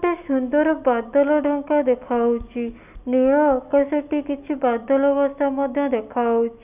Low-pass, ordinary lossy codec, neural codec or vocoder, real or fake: 3.6 kHz; none; none; real